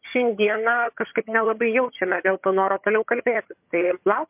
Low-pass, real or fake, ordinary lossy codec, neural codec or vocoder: 3.6 kHz; fake; MP3, 32 kbps; vocoder, 22.05 kHz, 80 mel bands, HiFi-GAN